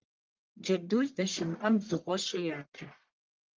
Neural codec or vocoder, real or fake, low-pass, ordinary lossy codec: codec, 44.1 kHz, 1.7 kbps, Pupu-Codec; fake; 7.2 kHz; Opus, 24 kbps